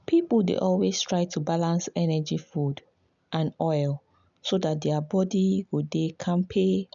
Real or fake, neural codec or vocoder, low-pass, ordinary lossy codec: real; none; 7.2 kHz; none